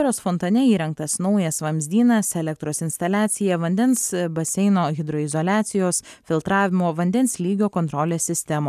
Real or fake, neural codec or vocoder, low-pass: real; none; 14.4 kHz